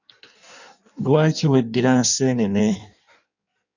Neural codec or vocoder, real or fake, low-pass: codec, 16 kHz in and 24 kHz out, 1.1 kbps, FireRedTTS-2 codec; fake; 7.2 kHz